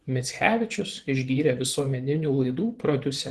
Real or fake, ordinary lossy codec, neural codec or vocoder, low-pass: fake; Opus, 16 kbps; vocoder, 24 kHz, 100 mel bands, Vocos; 10.8 kHz